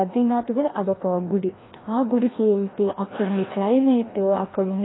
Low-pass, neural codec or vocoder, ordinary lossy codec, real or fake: 7.2 kHz; codec, 16 kHz, 1 kbps, FreqCodec, larger model; AAC, 16 kbps; fake